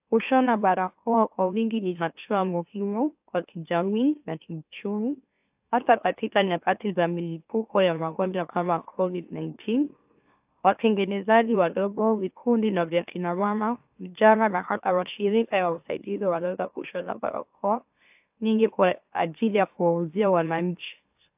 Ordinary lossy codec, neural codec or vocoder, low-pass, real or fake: AAC, 32 kbps; autoencoder, 44.1 kHz, a latent of 192 numbers a frame, MeloTTS; 3.6 kHz; fake